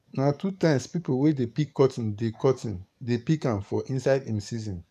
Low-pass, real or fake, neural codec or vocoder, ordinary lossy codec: 14.4 kHz; fake; codec, 44.1 kHz, 7.8 kbps, DAC; none